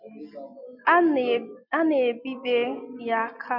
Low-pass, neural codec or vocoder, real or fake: 5.4 kHz; none; real